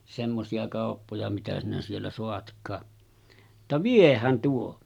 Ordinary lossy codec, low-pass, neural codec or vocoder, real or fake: none; 19.8 kHz; none; real